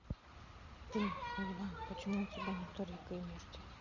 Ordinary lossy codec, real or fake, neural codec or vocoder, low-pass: Opus, 64 kbps; real; none; 7.2 kHz